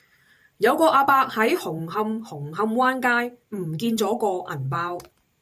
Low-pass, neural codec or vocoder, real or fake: 14.4 kHz; vocoder, 44.1 kHz, 128 mel bands every 512 samples, BigVGAN v2; fake